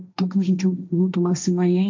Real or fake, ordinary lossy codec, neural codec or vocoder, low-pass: fake; MP3, 64 kbps; codec, 16 kHz, 1.1 kbps, Voila-Tokenizer; 7.2 kHz